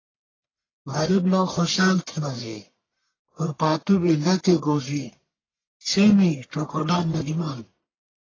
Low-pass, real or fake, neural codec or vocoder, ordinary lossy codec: 7.2 kHz; fake; codec, 44.1 kHz, 1.7 kbps, Pupu-Codec; AAC, 32 kbps